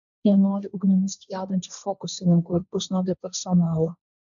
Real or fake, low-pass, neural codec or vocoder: fake; 7.2 kHz; codec, 16 kHz, 1.1 kbps, Voila-Tokenizer